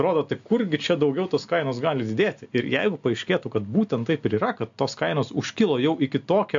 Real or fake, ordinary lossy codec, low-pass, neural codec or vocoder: real; AAC, 64 kbps; 7.2 kHz; none